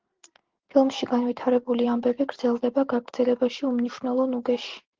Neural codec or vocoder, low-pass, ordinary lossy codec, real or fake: none; 7.2 kHz; Opus, 16 kbps; real